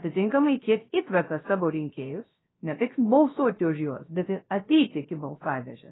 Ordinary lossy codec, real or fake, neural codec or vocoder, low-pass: AAC, 16 kbps; fake; codec, 16 kHz, 0.3 kbps, FocalCodec; 7.2 kHz